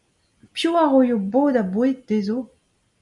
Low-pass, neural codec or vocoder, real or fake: 10.8 kHz; none; real